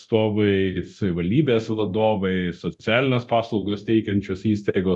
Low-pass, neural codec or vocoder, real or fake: 10.8 kHz; codec, 24 kHz, 0.9 kbps, DualCodec; fake